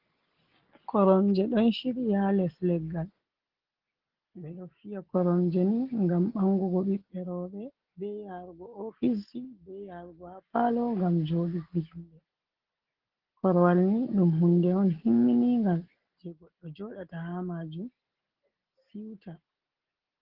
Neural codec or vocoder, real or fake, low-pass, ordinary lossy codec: none; real; 5.4 kHz; Opus, 16 kbps